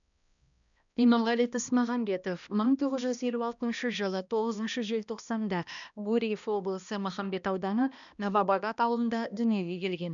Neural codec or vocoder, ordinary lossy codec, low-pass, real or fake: codec, 16 kHz, 1 kbps, X-Codec, HuBERT features, trained on balanced general audio; none; 7.2 kHz; fake